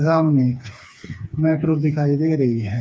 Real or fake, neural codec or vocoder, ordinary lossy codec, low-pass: fake; codec, 16 kHz, 4 kbps, FreqCodec, smaller model; none; none